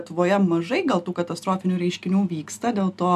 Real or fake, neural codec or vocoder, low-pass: real; none; 14.4 kHz